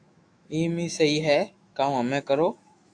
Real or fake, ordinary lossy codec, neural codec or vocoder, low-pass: fake; AAC, 64 kbps; autoencoder, 48 kHz, 128 numbers a frame, DAC-VAE, trained on Japanese speech; 9.9 kHz